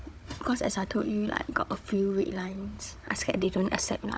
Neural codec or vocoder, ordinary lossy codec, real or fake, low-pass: codec, 16 kHz, 16 kbps, FunCodec, trained on Chinese and English, 50 frames a second; none; fake; none